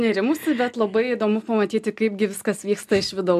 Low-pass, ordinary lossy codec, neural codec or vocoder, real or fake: 14.4 kHz; AAC, 96 kbps; none; real